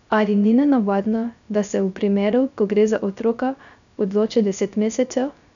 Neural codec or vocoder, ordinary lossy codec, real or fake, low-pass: codec, 16 kHz, 0.3 kbps, FocalCodec; none; fake; 7.2 kHz